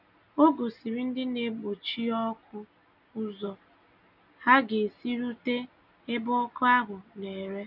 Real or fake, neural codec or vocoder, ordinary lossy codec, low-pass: real; none; none; 5.4 kHz